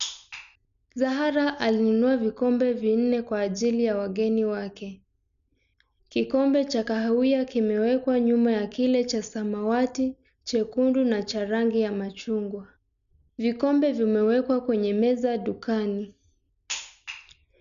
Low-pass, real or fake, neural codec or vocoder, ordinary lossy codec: 7.2 kHz; real; none; none